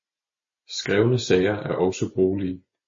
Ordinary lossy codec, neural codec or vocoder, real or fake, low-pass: MP3, 32 kbps; none; real; 7.2 kHz